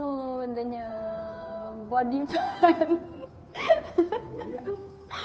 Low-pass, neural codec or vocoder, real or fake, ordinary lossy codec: none; codec, 16 kHz, 2 kbps, FunCodec, trained on Chinese and English, 25 frames a second; fake; none